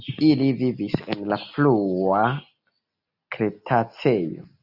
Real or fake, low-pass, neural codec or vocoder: real; 5.4 kHz; none